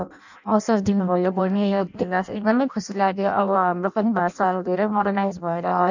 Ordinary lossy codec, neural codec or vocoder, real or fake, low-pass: none; codec, 16 kHz in and 24 kHz out, 0.6 kbps, FireRedTTS-2 codec; fake; 7.2 kHz